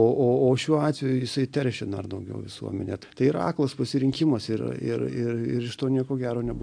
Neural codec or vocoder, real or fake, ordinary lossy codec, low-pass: none; real; AAC, 64 kbps; 9.9 kHz